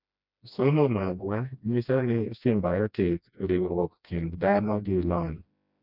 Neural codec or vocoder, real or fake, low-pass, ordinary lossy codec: codec, 16 kHz, 1 kbps, FreqCodec, smaller model; fake; 5.4 kHz; none